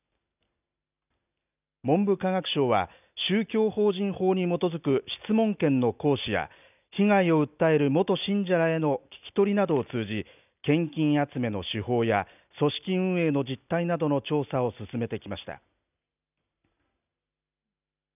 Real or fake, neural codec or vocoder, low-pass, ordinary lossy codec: real; none; 3.6 kHz; none